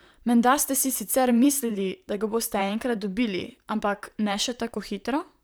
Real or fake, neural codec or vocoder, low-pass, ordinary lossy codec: fake; vocoder, 44.1 kHz, 128 mel bands, Pupu-Vocoder; none; none